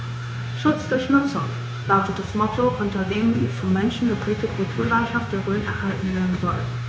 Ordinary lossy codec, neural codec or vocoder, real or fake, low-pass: none; codec, 16 kHz, 0.9 kbps, LongCat-Audio-Codec; fake; none